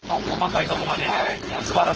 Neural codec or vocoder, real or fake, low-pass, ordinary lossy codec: codec, 16 kHz, 4.8 kbps, FACodec; fake; 7.2 kHz; Opus, 16 kbps